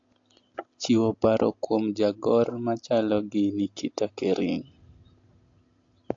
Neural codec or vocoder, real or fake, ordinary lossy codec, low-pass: none; real; AAC, 48 kbps; 7.2 kHz